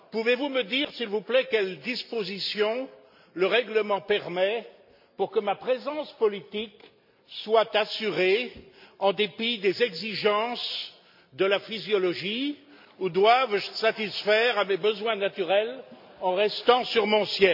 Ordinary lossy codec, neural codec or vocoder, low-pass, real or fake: MP3, 48 kbps; none; 5.4 kHz; real